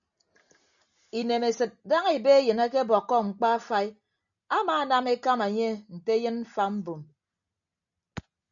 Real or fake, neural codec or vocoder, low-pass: real; none; 7.2 kHz